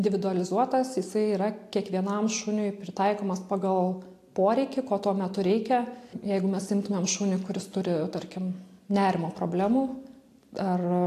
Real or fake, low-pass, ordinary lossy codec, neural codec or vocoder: real; 14.4 kHz; AAC, 64 kbps; none